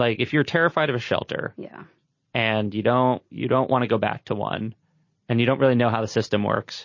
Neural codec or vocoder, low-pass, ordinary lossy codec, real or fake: none; 7.2 kHz; MP3, 32 kbps; real